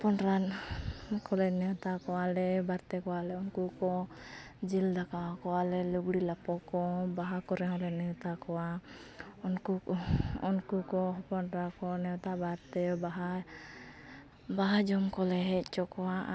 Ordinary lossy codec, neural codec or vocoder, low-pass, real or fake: none; none; none; real